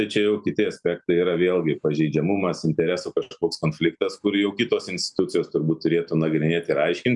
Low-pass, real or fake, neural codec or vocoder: 10.8 kHz; real; none